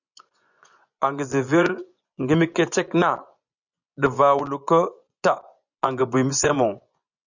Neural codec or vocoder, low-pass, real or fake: vocoder, 24 kHz, 100 mel bands, Vocos; 7.2 kHz; fake